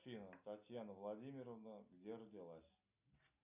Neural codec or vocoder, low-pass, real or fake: none; 3.6 kHz; real